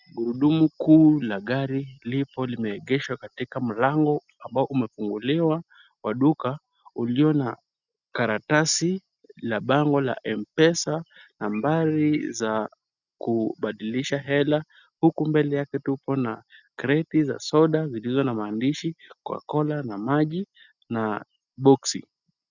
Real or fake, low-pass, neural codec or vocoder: real; 7.2 kHz; none